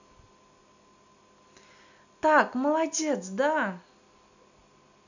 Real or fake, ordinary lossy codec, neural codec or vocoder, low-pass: real; none; none; 7.2 kHz